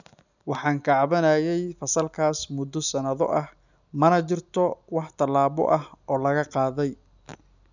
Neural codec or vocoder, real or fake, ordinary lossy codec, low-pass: none; real; none; 7.2 kHz